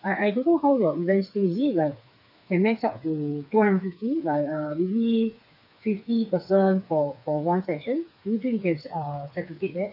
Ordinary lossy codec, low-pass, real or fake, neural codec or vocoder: none; 5.4 kHz; fake; codec, 16 kHz, 4 kbps, FreqCodec, smaller model